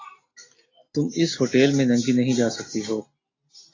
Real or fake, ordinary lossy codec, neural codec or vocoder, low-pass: real; AAC, 32 kbps; none; 7.2 kHz